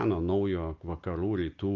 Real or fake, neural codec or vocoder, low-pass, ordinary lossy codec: real; none; 7.2 kHz; Opus, 32 kbps